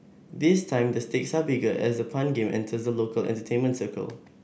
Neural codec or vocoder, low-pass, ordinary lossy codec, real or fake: none; none; none; real